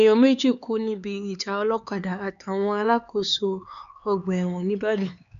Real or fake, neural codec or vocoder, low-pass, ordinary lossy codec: fake; codec, 16 kHz, 4 kbps, X-Codec, HuBERT features, trained on LibriSpeech; 7.2 kHz; none